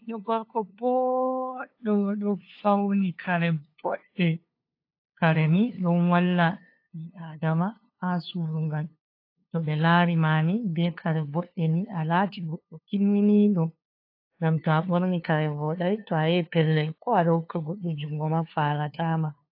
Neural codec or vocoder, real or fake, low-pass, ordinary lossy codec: codec, 16 kHz, 2 kbps, FunCodec, trained on LibriTTS, 25 frames a second; fake; 5.4 kHz; AAC, 32 kbps